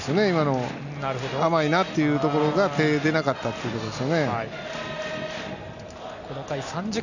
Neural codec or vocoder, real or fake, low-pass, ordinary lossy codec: none; real; 7.2 kHz; none